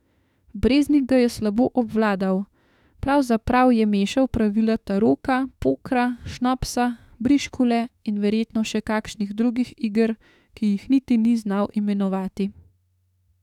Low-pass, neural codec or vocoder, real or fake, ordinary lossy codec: 19.8 kHz; autoencoder, 48 kHz, 32 numbers a frame, DAC-VAE, trained on Japanese speech; fake; none